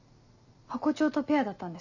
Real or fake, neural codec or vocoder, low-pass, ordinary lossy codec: real; none; 7.2 kHz; none